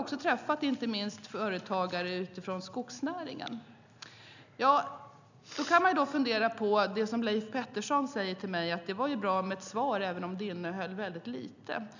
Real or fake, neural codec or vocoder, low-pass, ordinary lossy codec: real; none; 7.2 kHz; none